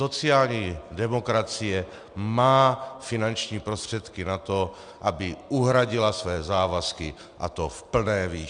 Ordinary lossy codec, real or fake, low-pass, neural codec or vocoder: Opus, 64 kbps; real; 9.9 kHz; none